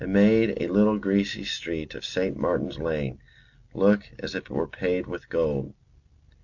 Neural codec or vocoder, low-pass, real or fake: none; 7.2 kHz; real